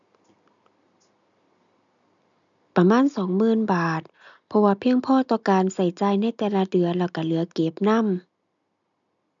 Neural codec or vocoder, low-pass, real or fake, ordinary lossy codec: none; 7.2 kHz; real; none